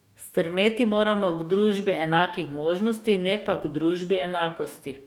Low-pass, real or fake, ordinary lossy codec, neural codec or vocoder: 19.8 kHz; fake; none; codec, 44.1 kHz, 2.6 kbps, DAC